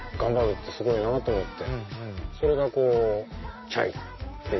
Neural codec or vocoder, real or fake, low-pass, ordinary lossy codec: none; real; 7.2 kHz; MP3, 24 kbps